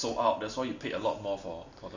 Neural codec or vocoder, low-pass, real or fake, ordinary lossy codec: none; 7.2 kHz; real; Opus, 64 kbps